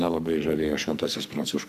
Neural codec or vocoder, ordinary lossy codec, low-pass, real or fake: codec, 44.1 kHz, 2.6 kbps, SNAC; AAC, 96 kbps; 14.4 kHz; fake